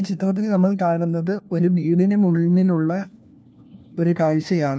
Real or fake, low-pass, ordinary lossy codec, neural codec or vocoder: fake; none; none; codec, 16 kHz, 1 kbps, FunCodec, trained on LibriTTS, 50 frames a second